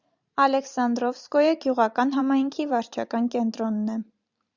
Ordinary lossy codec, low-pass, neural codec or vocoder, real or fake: Opus, 64 kbps; 7.2 kHz; vocoder, 44.1 kHz, 128 mel bands every 512 samples, BigVGAN v2; fake